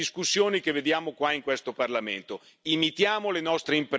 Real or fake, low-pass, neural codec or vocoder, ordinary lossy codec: real; none; none; none